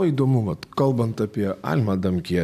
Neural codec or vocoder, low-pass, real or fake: none; 14.4 kHz; real